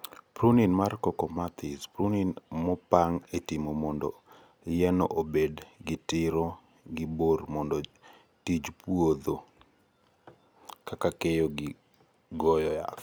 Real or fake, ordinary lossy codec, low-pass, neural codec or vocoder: real; none; none; none